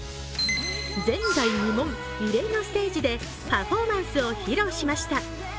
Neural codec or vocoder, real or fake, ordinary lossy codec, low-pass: none; real; none; none